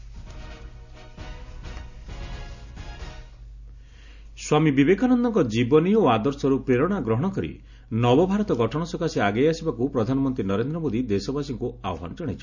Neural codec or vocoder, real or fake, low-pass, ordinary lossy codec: none; real; 7.2 kHz; none